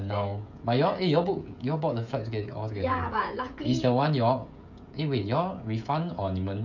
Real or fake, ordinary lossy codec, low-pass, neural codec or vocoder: fake; none; 7.2 kHz; codec, 16 kHz, 16 kbps, FreqCodec, smaller model